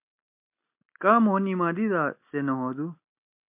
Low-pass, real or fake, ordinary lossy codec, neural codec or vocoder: 3.6 kHz; real; MP3, 32 kbps; none